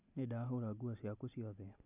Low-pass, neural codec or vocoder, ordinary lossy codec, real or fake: 3.6 kHz; none; none; real